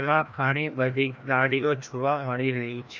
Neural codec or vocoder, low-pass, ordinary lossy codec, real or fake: codec, 16 kHz, 1 kbps, FreqCodec, larger model; none; none; fake